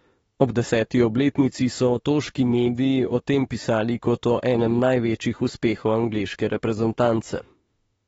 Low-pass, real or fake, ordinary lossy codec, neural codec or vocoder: 19.8 kHz; fake; AAC, 24 kbps; autoencoder, 48 kHz, 32 numbers a frame, DAC-VAE, trained on Japanese speech